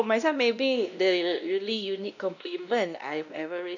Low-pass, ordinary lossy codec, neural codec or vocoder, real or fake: 7.2 kHz; none; codec, 16 kHz, 1 kbps, X-Codec, WavLM features, trained on Multilingual LibriSpeech; fake